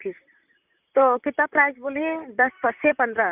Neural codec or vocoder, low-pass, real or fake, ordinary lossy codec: vocoder, 44.1 kHz, 128 mel bands, Pupu-Vocoder; 3.6 kHz; fake; none